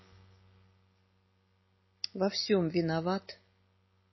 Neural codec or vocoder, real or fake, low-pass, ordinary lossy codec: none; real; 7.2 kHz; MP3, 24 kbps